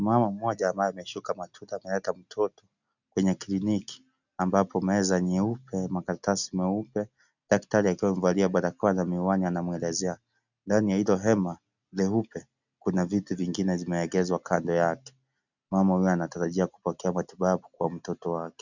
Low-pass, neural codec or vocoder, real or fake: 7.2 kHz; none; real